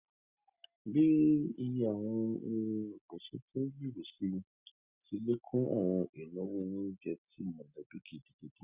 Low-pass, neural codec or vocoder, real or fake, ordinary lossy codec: 3.6 kHz; none; real; Opus, 64 kbps